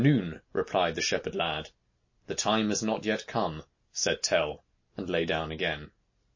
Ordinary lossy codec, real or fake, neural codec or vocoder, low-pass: MP3, 32 kbps; real; none; 7.2 kHz